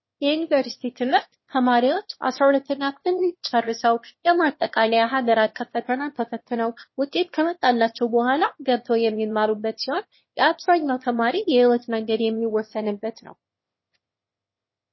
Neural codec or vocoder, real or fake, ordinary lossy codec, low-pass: autoencoder, 22.05 kHz, a latent of 192 numbers a frame, VITS, trained on one speaker; fake; MP3, 24 kbps; 7.2 kHz